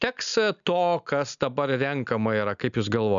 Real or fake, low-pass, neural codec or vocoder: real; 7.2 kHz; none